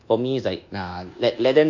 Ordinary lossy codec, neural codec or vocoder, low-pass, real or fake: none; codec, 24 kHz, 1.2 kbps, DualCodec; 7.2 kHz; fake